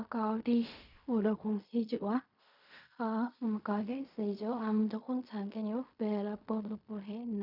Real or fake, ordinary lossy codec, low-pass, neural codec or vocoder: fake; none; 5.4 kHz; codec, 16 kHz in and 24 kHz out, 0.4 kbps, LongCat-Audio-Codec, fine tuned four codebook decoder